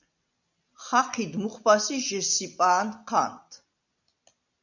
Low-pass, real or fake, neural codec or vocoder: 7.2 kHz; real; none